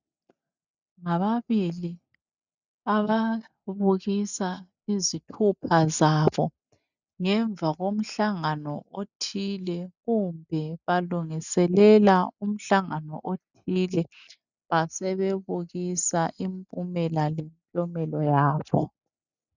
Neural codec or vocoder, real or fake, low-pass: none; real; 7.2 kHz